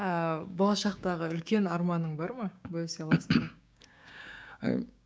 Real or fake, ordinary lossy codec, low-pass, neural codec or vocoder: fake; none; none; codec, 16 kHz, 6 kbps, DAC